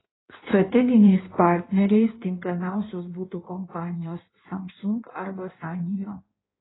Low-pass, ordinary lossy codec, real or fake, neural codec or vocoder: 7.2 kHz; AAC, 16 kbps; fake; codec, 16 kHz in and 24 kHz out, 1.1 kbps, FireRedTTS-2 codec